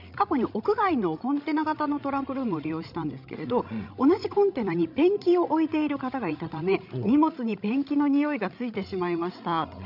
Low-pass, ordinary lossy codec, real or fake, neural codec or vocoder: 5.4 kHz; none; fake; codec, 16 kHz, 16 kbps, FreqCodec, larger model